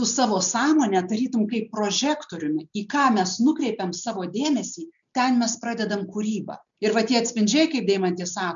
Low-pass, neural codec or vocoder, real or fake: 7.2 kHz; none; real